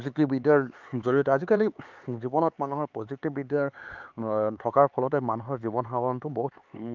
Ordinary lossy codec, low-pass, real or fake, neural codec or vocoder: Opus, 24 kbps; 7.2 kHz; fake; codec, 16 kHz, 4 kbps, X-Codec, HuBERT features, trained on LibriSpeech